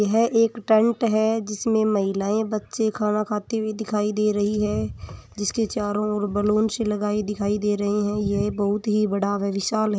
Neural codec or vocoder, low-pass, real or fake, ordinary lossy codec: none; none; real; none